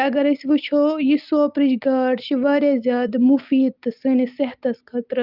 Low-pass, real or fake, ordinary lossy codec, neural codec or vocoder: 5.4 kHz; real; Opus, 24 kbps; none